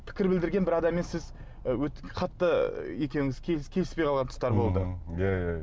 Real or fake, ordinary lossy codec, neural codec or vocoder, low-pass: real; none; none; none